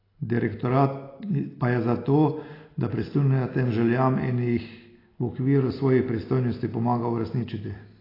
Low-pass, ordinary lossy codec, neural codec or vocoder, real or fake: 5.4 kHz; AAC, 24 kbps; none; real